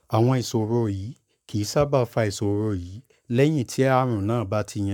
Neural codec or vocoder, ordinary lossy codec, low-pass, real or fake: codec, 44.1 kHz, 7.8 kbps, Pupu-Codec; none; 19.8 kHz; fake